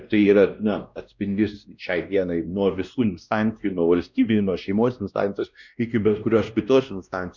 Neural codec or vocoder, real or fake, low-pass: codec, 16 kHz, 1 kbps, X-Codec, WavLM features, trained on Multilingual LibriSpeech; fake; 7.2 kHz